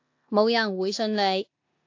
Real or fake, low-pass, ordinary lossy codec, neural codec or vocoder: fake; 7.2 kHz; AAC, 48 kbps; codec, 16 kHz in and 24 kHz out, 0.9 kbps, LongCat-Audio-Codec, four codebook decoder